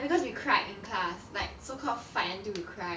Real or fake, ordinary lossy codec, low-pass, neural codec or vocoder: real; none; none; none